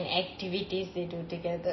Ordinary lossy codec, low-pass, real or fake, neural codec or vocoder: MP3, 24 kbps; 7.2 kHz; real; none